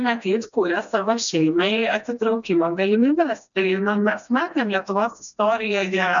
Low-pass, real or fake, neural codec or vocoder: 7.2 kHz; fake; codec, 16 kHz, 1 kbps, FreqCodec, smaller model